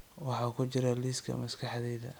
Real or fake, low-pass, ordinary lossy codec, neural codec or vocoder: real; none; none; none